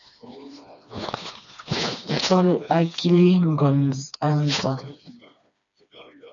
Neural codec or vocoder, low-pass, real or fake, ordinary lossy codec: codec, 16 kHz, 2 kbps, FreqCodec, smaller model; 7.2 kHz; fake; MP3, 96 kbps